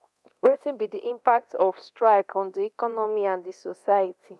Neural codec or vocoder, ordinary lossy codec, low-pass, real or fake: codec, 24 kHz, 0.9 kbps, DualCodec; none; none; fake